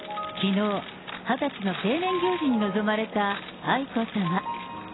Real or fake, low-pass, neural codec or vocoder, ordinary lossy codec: real; 7.2 kHz; none; AAC, 16 kbps